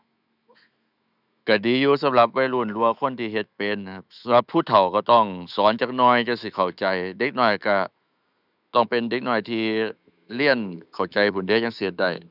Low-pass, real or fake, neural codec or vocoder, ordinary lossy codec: 5.4 kHz; fake; autoencoder, 48 kHz, 128 numbers a frame, DAC-VAE, trained on Japanese speech; none